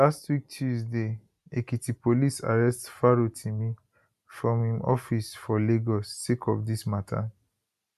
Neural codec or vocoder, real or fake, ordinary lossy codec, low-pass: none; real; none; 14.4 kHz